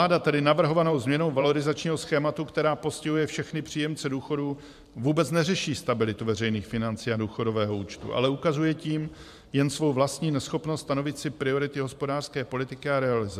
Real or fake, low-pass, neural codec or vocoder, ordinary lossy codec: fake; 14.4 kHz; vocoder, 44.1 kHz, 128 mel bands every 512 samples, BigVGAN v2; MP3, 96 kbps